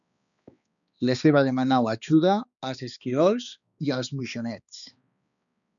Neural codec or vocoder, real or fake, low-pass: codec, 16 kHz, 2 kbps, X-Codec, HuBERT features, trained on balanced general audio; fake; 7.2 kHz